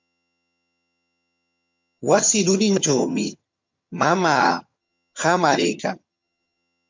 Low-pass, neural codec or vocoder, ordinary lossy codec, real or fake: 7.2 kHz; vocoder, 22.05 kHz, 80 mel bands, HiFi-GAN; MP3, 64 kbps; fake